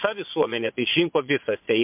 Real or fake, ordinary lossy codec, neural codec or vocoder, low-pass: fake; MP3, 32 kbps; vocoder, 22.05 kHz, 80 mel bands, Vocos; 3.6 kHz